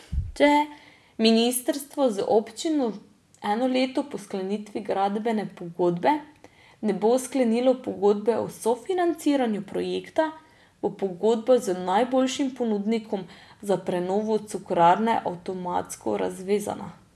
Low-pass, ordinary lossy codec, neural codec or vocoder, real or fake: none; none; none; real